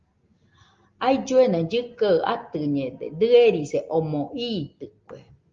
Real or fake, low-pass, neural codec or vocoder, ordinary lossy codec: real; 7.2 kHz; none; Opus, 32 kbps